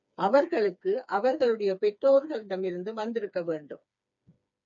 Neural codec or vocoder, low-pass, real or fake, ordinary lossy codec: codec, 16 kHz, 4 kbps, FreqCodec, smaller model; 7.2 kHz; fake; MP3, 64 kbps